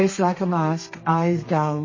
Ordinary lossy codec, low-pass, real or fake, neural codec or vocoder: MP3, 32 kbps; 7.2 kHz; fake; codec, 32 kHz, 1.9 kbps, SNAC